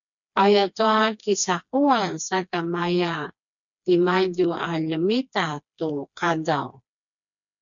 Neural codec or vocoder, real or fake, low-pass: codec, 16 kHz, 2 kbps, FreqCodec, smaller model; fake; 7.2 kHz